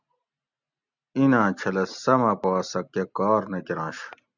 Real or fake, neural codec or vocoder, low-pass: real; none; 7.2 kHz